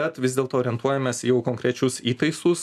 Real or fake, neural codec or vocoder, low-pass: real; none; 14.4 kHz